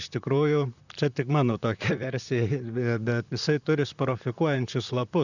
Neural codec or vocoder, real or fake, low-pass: vocoder, 44.1 kHz, 128 mel bands, Pupu-Vocoder; fake; 7.2 kHz